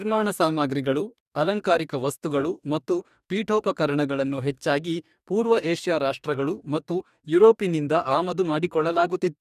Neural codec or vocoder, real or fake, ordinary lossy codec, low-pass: codec, 44.1 kHz, 2.6 kbps, DAC; fake; none; 14.4 kHz